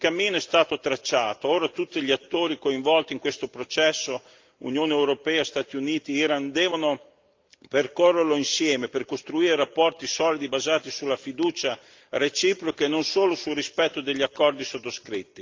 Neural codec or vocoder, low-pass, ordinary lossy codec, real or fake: none; 7.2 kHz; Opus, 24 kbps; real